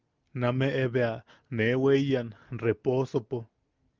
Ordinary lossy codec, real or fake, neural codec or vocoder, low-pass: Opus, 24 kbps; real; none; 7.2 kHz